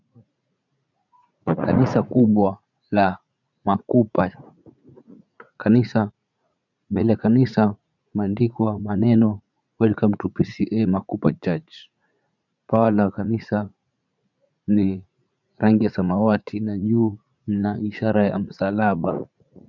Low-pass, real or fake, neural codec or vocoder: 7.2 kHz; fake; vocoder, 44.1 kHz, 80 mel bands, Vocos